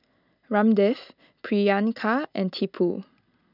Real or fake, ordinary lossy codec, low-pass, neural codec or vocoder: real; none; 5.4 kHz; none